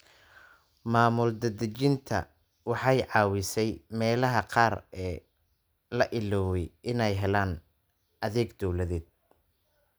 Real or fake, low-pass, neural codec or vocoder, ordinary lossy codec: real; none; none; none